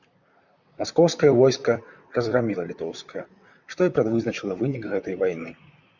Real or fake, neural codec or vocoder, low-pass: fake; vocoder, 44.1 kHz, 128 mel bands, Pupu-Vocoder; 7.2 kHz